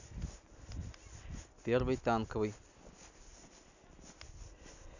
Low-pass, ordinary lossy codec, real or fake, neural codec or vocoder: 7.2 kHz; none; real; none